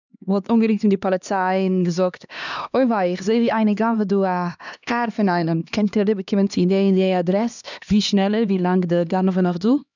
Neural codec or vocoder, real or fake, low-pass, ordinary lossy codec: codec, 16 kHz, 2 kbps, X-Codec, HuBERT features, trained on LibriSpeech; fake; 7.2 kHz; none